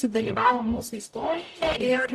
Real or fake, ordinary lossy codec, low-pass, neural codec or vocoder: fake; Opus, 64 kbps; 14.4 kHz; codec, 44.1 kHz, 0.9 kbps, DAC